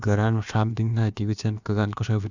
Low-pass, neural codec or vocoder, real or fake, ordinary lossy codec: 7.2 kHz; codec, 16 kHz, about 1 kbps, DyCAST, with the encoder's durations; fake; none